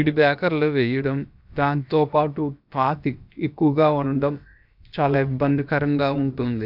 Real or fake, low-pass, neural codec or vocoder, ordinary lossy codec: fake; 5.4 kHz; codec, 16 kHz, about 1 kbps, DyCAST, with the encoder's durations; none